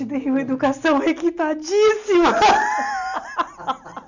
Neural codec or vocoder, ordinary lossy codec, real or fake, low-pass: none; none; real; 7.2 kHz